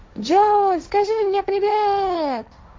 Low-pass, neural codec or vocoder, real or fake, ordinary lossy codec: none; codec, 16 kHz, 1.1 kbps, Voila-Tokenizer; fake; none